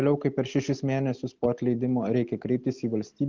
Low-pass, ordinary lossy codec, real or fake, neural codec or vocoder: 7.2 kHz; Opus, 24 kbps; real; none